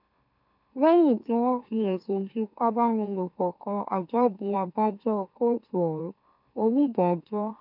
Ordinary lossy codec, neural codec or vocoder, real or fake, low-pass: none; autoencoder, 44.1 kHz, a latent of 192 numbers a frame, MeloTTS; fake; 5.4 kHz